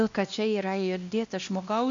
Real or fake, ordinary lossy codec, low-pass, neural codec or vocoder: fake; AAC, 64 kbps; 7.2 kHz; codec, 16 kHz, 1 kbps, X-Codec, WavLM features, trained on Multilingual LibriSpeech